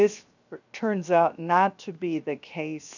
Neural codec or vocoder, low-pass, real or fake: codec, 16 kHz, 0.7 kbps, FocalCodec; 7.2 kHz; fake